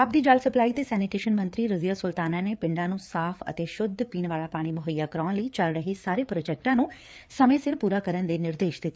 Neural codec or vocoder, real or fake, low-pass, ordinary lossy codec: codec, 16 kHz, 4 kbps, FreqCodec, larger model; fake; none; none